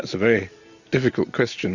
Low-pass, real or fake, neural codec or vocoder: 7.2 kHz; fake; vocoder, 44.1 kHz, 128 mel bands every 256 samples, BigVGAN v2